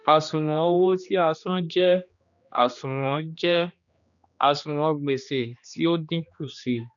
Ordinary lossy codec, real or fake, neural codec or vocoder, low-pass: none; fake; codec, 16 kHz, 2 kbps, X-Codec, HuBERT features, trained on general audio; 7.2 kHz